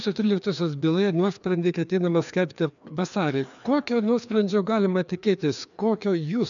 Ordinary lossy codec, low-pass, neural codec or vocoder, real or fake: MP3, 96 kbps; 7.2 kHz; codec, 16 kHz, 2 kbps, FreqCodec, larger model; fake